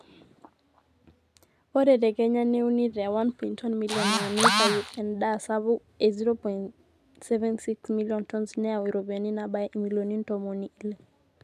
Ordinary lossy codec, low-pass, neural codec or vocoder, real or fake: MP3, 96 kbps; 14.4 kHz; none; real